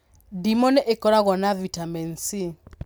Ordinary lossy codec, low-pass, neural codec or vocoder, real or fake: none; none; none; real